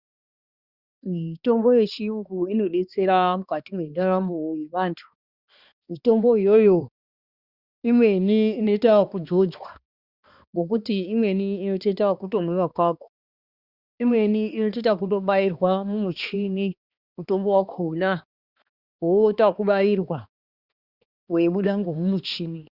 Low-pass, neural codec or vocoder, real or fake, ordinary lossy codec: 5.4 kHz; codec, 16 kHz, 2 kbps, X-Codec, HuBERT features, trained on balanced general audio; fake; Opus, 64 kbps